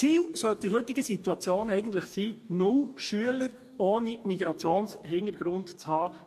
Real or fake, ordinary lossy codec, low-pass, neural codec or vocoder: fake; MP3, 64 kbps; 14.4 kHz; codec, 44.1 kHz, 2.6 kbps, DAC